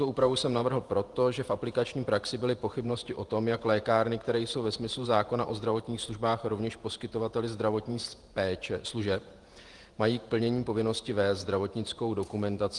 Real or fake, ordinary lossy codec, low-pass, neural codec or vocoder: fake; Opus, 24 kbps; 10.8 kHz; vocoder, 48 kHz, 128 mel bands, Vocos